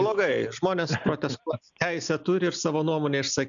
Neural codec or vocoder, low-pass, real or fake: none; 7.2 kHz; real